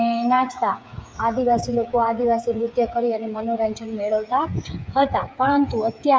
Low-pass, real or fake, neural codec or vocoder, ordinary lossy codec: none; fake; codec, 16 kHz, 8 kbps, FreqCodec, smaller model; none